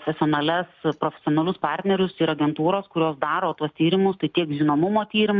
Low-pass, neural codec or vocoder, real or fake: 7.2 kHz; none; real